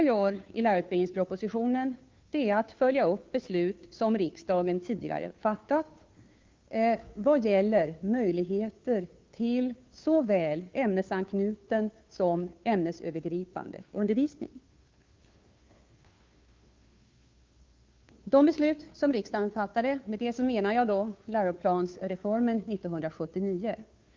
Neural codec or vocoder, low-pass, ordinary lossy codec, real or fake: codec, 16 kHz, 2 kbps, FunCodec, trained on Chinese and English, 25 frames a second; 7.2 kHz; Opus, 32 kbps; fake